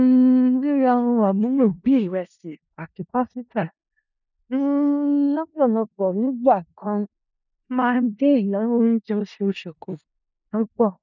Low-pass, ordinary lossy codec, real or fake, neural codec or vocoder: 7.2 kHz; none; fake; codec, 16 kHz in and 24 kHz out, 0.4 kbps, LongCat-Audio-Codec, four codebook decoder